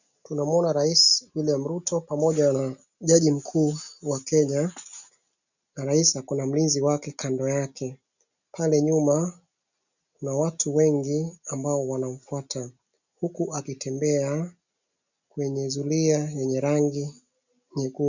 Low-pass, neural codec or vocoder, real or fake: 7.2 kHz; none; real